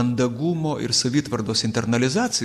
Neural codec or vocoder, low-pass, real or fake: none; 14.4 kHz; real